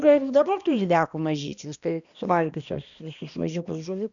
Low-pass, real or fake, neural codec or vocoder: 7.2 kHz; fake; codec, 16 kHz, 1 kbps, X-Codec, HuBERT features, trained on balanced general audio